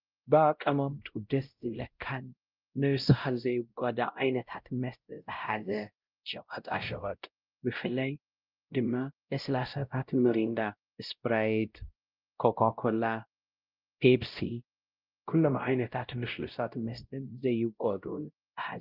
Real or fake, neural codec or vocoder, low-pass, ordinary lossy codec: fake; codec, 16 kHz, 0.5 kbps, X-Codec, WavLM features, trained on Multilingual LibriSpeech; 5.4 kHz; Opus, 24 kbps